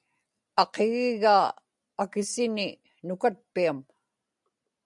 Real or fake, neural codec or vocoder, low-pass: real; none; 10.8 kHz